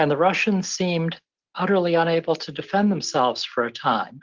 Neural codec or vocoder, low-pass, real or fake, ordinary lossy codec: none; 7.2 kHz; real; Opus, 16 kbps